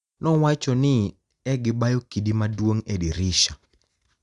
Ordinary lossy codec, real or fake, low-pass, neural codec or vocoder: Opus, 64 kbps; real; 10.8 kHz; none